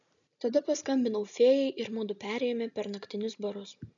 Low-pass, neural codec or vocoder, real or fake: 7.2 kHz; none; real